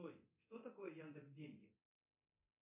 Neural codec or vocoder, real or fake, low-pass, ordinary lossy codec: codec, 24 kHz, 0.9 kbps, DualCodec; fake; 3.6 kHz; MP3, 32 kbps